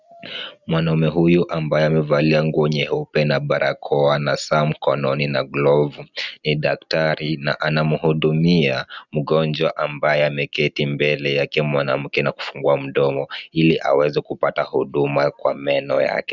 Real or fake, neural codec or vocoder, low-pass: real; none; 7.2 kHz